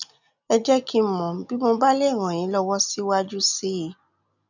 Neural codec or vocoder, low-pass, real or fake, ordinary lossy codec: none; 7.2 kHz; real; none